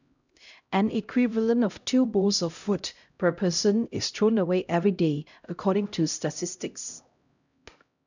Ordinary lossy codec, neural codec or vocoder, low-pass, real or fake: none; codec, 16 kHz, 0.5 kbps, X-Codec, HuBERT features, trained on LibriSpeech; 7.2 kHz; fake